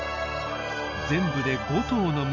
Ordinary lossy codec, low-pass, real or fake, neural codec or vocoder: none; 7.2 kHz; real; none